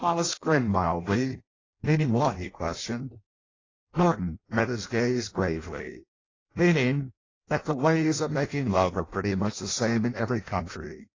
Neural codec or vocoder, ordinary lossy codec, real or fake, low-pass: codec, 16 kHz in and 24 kHz out, 0.6 kbps, FireRedTTS-2 codec; AAC, 32 kbps; fake; 7.2 kHz